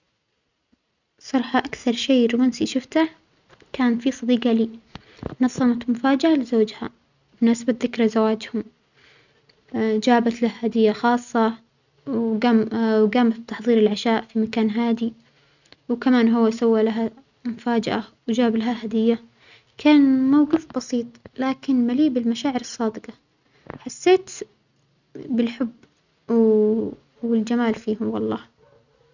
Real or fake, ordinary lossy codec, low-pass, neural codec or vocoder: real; none; 7.2 kHz; none